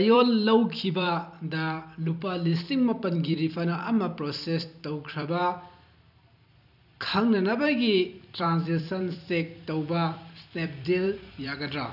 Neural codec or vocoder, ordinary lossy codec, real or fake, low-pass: vocoder, 44.1 kHz, 128 mel bands every 256 samples, BigVGAN v2; none; fake; 5.4 kHz